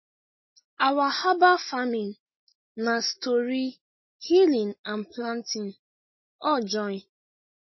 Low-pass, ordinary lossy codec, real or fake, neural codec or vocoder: 7.2 kHz; MP3, 24 kbps; real; none